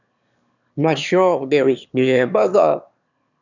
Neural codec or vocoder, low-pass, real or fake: autoencoder, 22.05 kHz, a latent of 192 numbers a frame, VITS, trained on one speaker; 7.2 kHz; fake